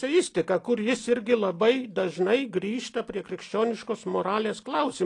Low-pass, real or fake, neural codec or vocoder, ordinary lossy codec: 10.8 kHz; real; none; AAC, 48 kbps